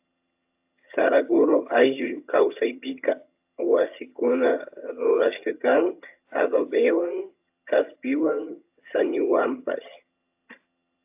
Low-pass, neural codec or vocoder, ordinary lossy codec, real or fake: 3.6 kHz; vocoder, 22.05 kHz, 80 mel bands, HiFi-GAN; AAC, 32 kbps; fake